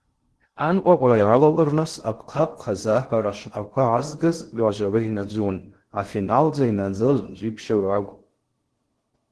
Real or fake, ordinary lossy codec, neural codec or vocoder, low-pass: fake; Opus, 16 kbps; codec, 16 kHz in and 24 kHz out, 0.6 kbps, FocalCodec, streaming, 2048 codes; 10.8 kHz